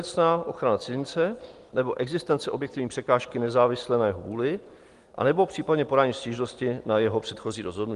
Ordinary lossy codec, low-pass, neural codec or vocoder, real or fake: Opus, 24 kbps; 10.8 kHz; none; real